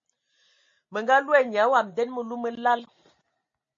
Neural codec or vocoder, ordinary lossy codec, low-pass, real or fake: none; MP3, 32 kbps; 7.2 kHz; real